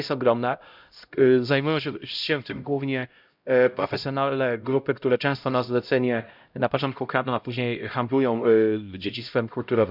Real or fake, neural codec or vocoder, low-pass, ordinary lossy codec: fake; codec, 16 kHz, 0.5 kbps, X-Codec, HuBERT features, trained on LibriSpeech; 5.4 kHz; none